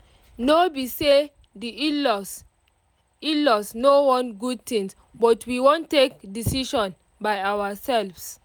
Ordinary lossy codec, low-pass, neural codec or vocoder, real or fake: none; none; none; real